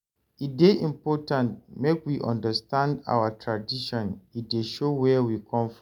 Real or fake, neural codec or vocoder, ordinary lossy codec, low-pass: real; none; none; 19.8 kHz